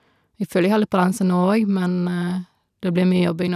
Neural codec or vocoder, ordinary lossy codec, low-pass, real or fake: none; none; 14.4 kHz; real